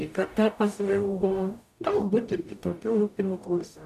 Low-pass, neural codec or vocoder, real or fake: 14.4 kHz; codec, 44.1 kHz, 0.9 kbps, DAC; fake